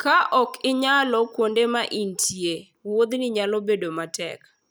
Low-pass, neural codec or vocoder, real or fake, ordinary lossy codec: none; none; real; none